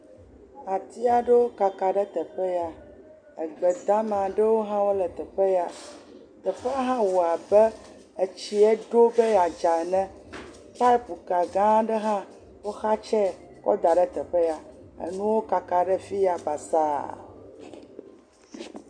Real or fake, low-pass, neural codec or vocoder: real; 9.9 kHz; none